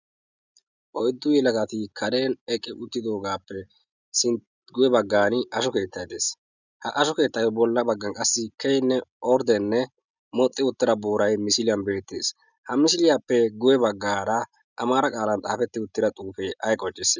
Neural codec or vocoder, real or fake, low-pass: none; real; 7.2 kHz